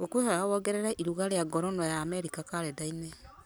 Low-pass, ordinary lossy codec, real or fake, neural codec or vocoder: none; none; real; none